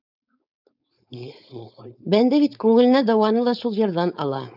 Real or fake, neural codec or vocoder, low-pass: fake; codec, 16 kHz, 4.8 kbps, FACodec; 5.4 kHz